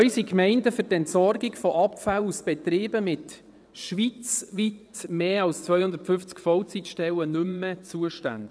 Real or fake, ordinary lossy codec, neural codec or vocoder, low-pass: fake; none; vocoder, 22.05 kHz, 80 mel bands, WaveNeXt; none